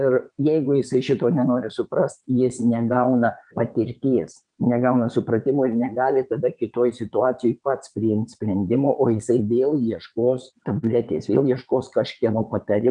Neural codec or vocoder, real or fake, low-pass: vocoder, 22.05 kHz, 80 mel bands, WaveNeXt; fake; 9.9 kHz